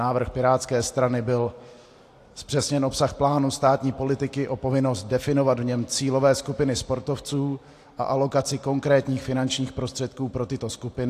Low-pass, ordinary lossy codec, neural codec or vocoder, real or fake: 14.4 kHz; AAC, 64 kbps; none; real